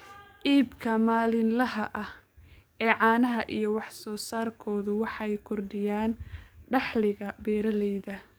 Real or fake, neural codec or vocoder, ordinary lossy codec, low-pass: fake; codec, 44.1 kHz, 7.8 kbps, DAC; none; none